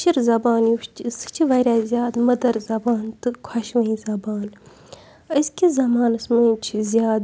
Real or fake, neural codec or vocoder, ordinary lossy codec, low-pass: real; none; none; none